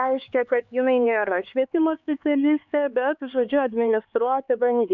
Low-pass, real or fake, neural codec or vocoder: 7.2 kHz; fake; codec, 16 kHz, 4 kbps, X-Codec, HuBERT features, trained on LibriSpeech